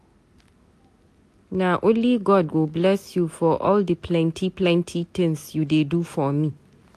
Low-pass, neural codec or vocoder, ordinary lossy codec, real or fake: 14.4 kHz; none; AAC, 64 kbps; real